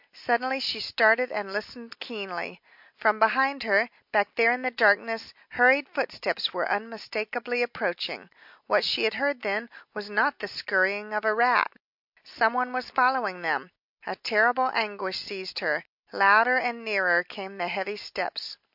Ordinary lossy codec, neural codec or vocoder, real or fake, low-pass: MP3, 48 kbps; none; real; 5.4 kHz